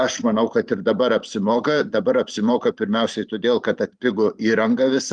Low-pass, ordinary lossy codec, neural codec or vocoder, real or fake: 9.9 kHz; Opus, 32 kbps; vocoder, 44.1 kHz, 128 mel bands every 512 samples, BigVGAN v2; fake